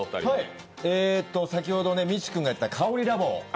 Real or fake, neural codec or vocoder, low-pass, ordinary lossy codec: real; none; none; none